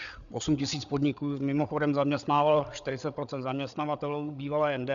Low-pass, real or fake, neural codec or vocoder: 7.2 kHz; fake; codec, 16 kHz, 8 kbps, FreqCodec, larger model